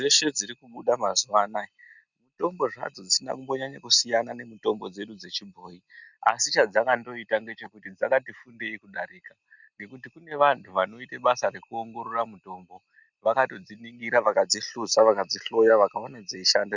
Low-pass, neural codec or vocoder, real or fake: 7.2 kHz; none; real